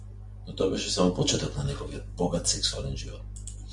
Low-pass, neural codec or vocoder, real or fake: 10.8 kHz; none; real